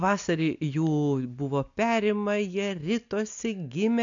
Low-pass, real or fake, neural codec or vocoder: 7.2 kHz; real; none